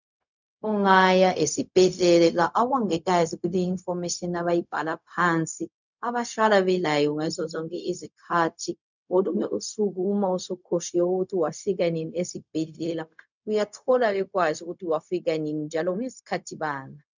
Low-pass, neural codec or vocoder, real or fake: 7.2 kHz; codec, 16 kHz, 0.4 kbps, LongCat-Audio-Codec; fake